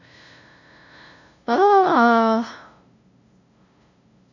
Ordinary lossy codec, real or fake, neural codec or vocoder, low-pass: AAC, 48 kbps; fake; codec, 16 kHz, 0.5 kbps, FunCodec, trained on LibriTTS, 25 frames a second; 7.2 kHz